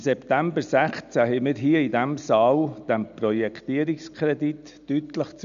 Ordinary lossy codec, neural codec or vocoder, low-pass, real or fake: none; none; 7.2 kHz; real